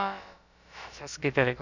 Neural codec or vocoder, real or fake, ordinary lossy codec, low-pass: codec, 16 kHz, about 1 kbps, DyCAST, with the encoder's durations; fake; none; 7.2 kHz